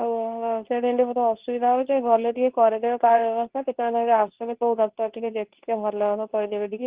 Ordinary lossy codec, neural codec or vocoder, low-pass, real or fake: Opus, 16 kbps; codec, 24 kHz, 0.9 kbps, WavTokenizer, medium speech release version 2; 3.6 kHz; fake